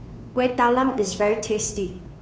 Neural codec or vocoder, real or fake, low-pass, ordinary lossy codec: codec, 16 kHz, 2 kbps, FunCodec, trained on Chinese and English, 25 frames a second; fake; none; none